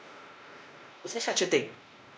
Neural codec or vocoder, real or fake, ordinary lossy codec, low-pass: codec, 16 kHz, 1 kbps, X-Codec, WavLM features, trained on Multilingual LibriSpeech; fake; none; none